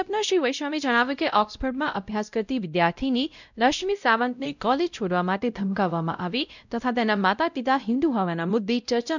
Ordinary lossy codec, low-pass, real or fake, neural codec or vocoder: none; 7.2 kHz; fake; codec, 16 kHz, 0.5 kbps, X-Codec, WavLM features, trained on Multilingual LibriSpeech